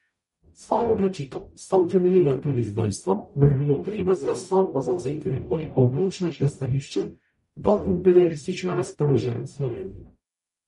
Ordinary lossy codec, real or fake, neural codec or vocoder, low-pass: MP3, 48 kbps; fake; codec, 44.1 kHz, 0.9 kbps, DAC; 19.8 kHz